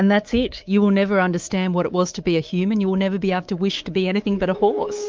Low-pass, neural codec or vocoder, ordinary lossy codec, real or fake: 7.2 kHz; codec, 24 kHz, 3.1 kbps, DualCodec; Opus, 24 kbps; fake